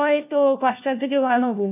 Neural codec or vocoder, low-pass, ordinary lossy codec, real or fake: codec, 16 kHz, 1 kbps, FunCodec, trained on LibriTTS, 50 frames a second; 3.6 kHz; none; fake